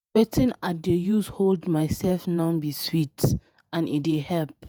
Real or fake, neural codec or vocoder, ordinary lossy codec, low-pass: fake; vocoder, 48 kHz, 128 mel bands, Vocos; none; none